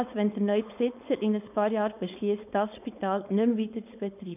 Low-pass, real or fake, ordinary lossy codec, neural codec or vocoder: 3.6 kHz; fake; none; codec, 16 kHz, 4.8 kbps, FACodec